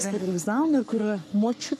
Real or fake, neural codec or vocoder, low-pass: fake; codec, 44.1 kHz, 3.4 kbps, Pupu-Codec; 14.4 kHz